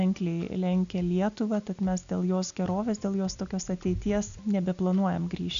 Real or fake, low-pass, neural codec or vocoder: real; 7.2 kHz; none